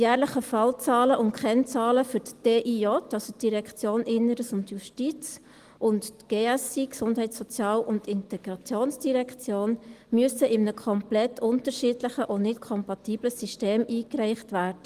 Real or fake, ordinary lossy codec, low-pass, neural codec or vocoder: real; Opus, 24 kbps; 14.4 kHz; none